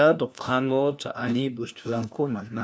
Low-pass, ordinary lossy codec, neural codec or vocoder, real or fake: none; none; codec, 16 kHz, 1 kbps, FunCodec, trained on LibriTTS, 50 frames a second; fake